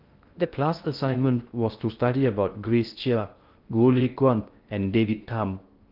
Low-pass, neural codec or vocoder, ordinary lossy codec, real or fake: 5.4 kHz; codec, 16 kHz in and 24 kHz out, 0.6 kbps, FocalCodec, streaming, 2048 codes; Opus, 24 kbps; fake